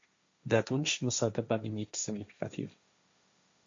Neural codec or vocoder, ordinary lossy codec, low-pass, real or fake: codec, 16 kHz, 1.1 kbps, Voila-Tokenizer; MP3, 48 kbps; 7.2 kHz; fake